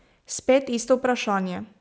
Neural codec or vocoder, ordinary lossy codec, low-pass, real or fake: none; none; none; real